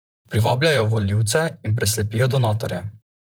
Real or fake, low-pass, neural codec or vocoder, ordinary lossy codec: fake; none; vocoder, 44.1 kHz, 128 mel bands, Pupu-Vocoder; none